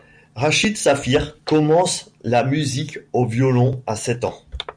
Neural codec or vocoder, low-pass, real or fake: none; 9.9 kHz; real